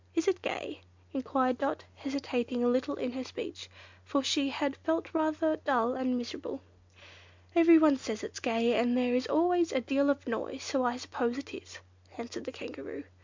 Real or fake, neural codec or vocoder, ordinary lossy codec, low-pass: real; none; MP3, 64 kbps; 7.2 kHz